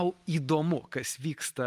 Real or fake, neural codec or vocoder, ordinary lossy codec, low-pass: real; none; Opus, 32 kbps; 14.4 kHz